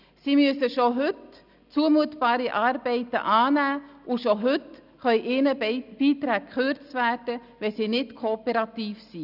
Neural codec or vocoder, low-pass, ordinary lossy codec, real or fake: none; 5.4 kHz; none; real